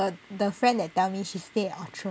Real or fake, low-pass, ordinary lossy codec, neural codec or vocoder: real; none; none; none